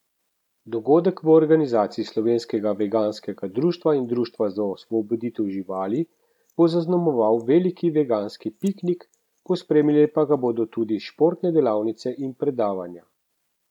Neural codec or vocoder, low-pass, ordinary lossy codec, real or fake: none; 19.8 kHz; none; real